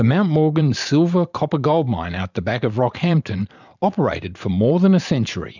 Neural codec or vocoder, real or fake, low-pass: vocoder, 44.1 kHz, 128 mel bands every 512 samples, BigVGAN v2; fake; 7.2 kHz